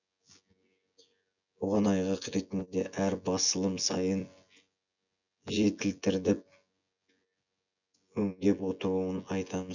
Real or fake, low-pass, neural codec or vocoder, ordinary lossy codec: fake; 7.2 kHz; vocoder, 24 kHz, 100 mel bands, Vocos; none